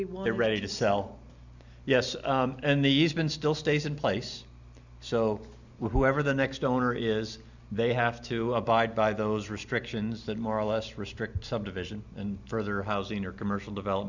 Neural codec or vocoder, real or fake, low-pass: none; real; 7.2 kHz